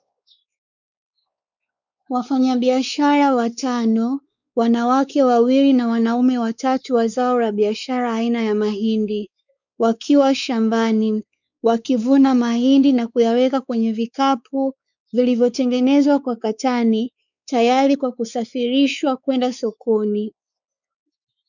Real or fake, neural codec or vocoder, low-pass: fake; codec, 16 kHz, 4 kbps, X-Codec, WavLM features, trained on Multilingual LibriSpeech; 7.2 kHz